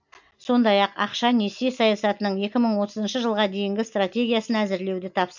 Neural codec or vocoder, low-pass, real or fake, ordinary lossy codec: none; 7.2 kHz; real; none